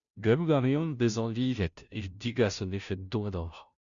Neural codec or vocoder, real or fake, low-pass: codec, 16 kHz, 0.5 kbps, FunCodec, trained on Chinese and English, 25 frames a second; fake; 7.2 kHz